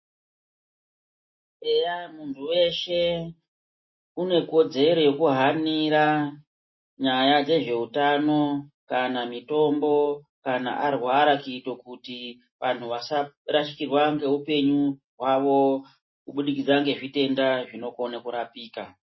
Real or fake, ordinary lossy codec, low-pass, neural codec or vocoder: real; MP3, 24 kbps; 7.2 kHz; none